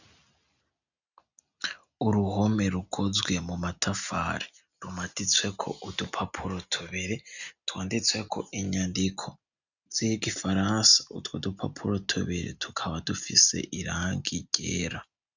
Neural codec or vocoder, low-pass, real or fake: none; 7.2 kHz; real